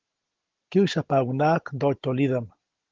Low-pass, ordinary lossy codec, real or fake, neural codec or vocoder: 7.2 kHz; Opus, 16 kbps; real; none